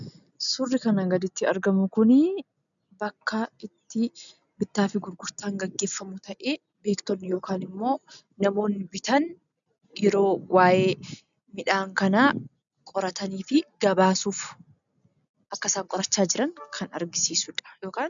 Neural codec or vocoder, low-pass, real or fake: none; 7.2 kHz; real